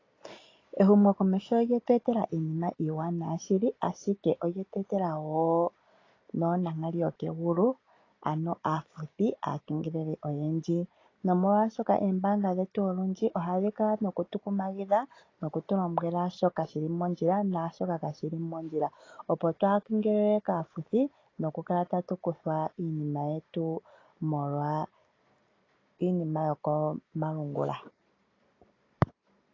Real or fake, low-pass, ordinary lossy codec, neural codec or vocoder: real; 7.2 kHz; AAC, 32 kbps; none